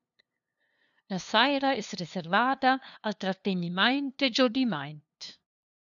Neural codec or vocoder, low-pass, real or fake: codec, 16 kHz, 2 kbps, FunCodec, trained on LibriTTS, 25 frames a second; 7.2 kHz; fake